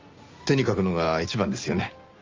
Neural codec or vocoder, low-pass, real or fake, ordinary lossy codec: none; 7.2 kHz; real; Opus, 32 kbps